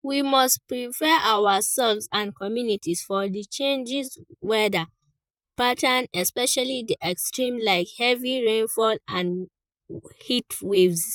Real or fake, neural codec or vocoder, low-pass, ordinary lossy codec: fake; vocoder, 44.1 kHz, 128 mel bands, Pupu-Vocoder; 19.8 kHz; none